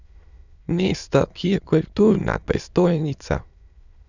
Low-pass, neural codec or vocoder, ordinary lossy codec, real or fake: 7.2 kHz; autoencoder, 22.05 kHz, a latent of 192 numbers a frame, VITS, trained on many speakers; none; fake